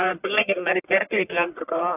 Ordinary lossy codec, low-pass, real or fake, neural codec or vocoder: AAC, 24 kbps; 3.6 kHz; fake; codec, 44.1 kHz, 1.7 kbps, Pupu-Codec